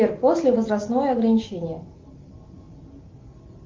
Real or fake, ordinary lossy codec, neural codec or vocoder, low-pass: real; Opus, 32 kbps; none; 7.2 kHz